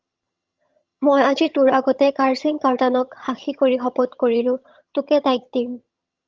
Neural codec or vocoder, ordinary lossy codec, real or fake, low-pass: vocoder, 22.05 kHz, 80 mel bands, HiFi-GAN; Opus, 32 kbps; fake; 7.2 kHz